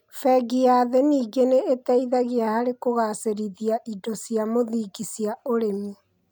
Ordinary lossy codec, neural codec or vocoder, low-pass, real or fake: none; none; none; real